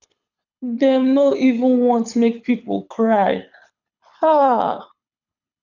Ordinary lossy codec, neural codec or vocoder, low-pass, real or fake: none; codec, 24 kHz, 6 kbps, HILCodec; 7.2 kHz; fake